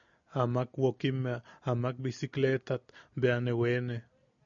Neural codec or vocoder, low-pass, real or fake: none; 7.2 kHz; real